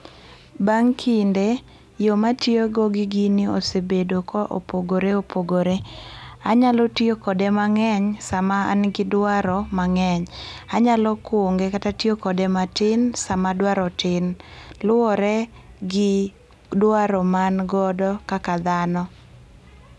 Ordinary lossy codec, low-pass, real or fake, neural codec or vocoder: none; none; real; none